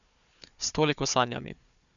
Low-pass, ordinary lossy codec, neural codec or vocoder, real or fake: 7.2 kHz; none; codec, 16 kHz, 4 kbps, FunCodec, trained on Chinese and English, 50 frames a second; fake